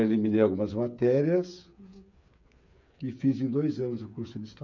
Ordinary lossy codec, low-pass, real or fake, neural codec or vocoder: none; 7.2 kHz; fake; codec, 16 kHz, 8 kbps, FreqCodec, smaller model